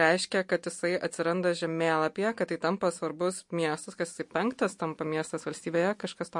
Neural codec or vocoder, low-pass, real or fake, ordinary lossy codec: none; 10.8 kHz; real; MP3, 48 kbps